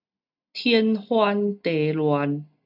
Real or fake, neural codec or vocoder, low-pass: real; none; 5.4 kHz